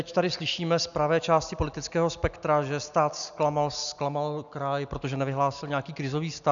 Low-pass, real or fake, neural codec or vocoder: 7.2 kHz; real; none